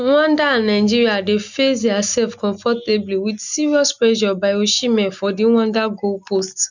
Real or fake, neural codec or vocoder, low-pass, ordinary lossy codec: real; none; 7.2 kHz; none